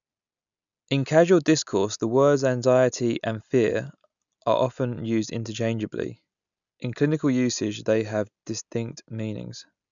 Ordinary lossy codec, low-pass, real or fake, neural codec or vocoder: none; 7.2 kHz; real; none